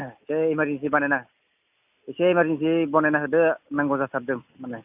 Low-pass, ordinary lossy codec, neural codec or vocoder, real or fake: 3.6 kHz; AAC, 32 kbps; none; real